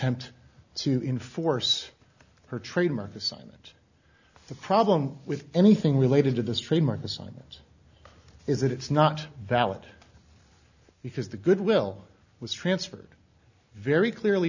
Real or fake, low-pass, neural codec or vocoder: real; 7.2 kHz; none